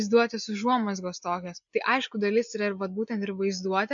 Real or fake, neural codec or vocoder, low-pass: real; none; 7.2 kHz